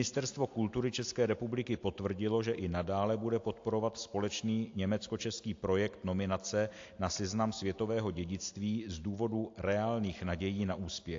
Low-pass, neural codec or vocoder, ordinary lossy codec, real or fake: 7.2 kHz; none; AAC, 48 kbps; real